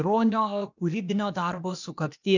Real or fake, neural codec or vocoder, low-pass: fake; codec, 16 kHz, 0.8 kbps, ZipCodec; 7.2 kHz